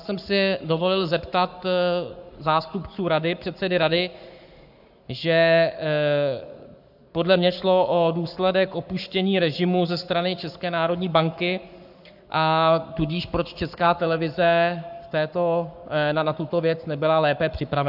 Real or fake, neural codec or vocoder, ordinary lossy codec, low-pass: fake; codec, 44.1 kHz, 7.8 kbps, Pupu-Codec; AAC, 48 kbps; 5.4 kHz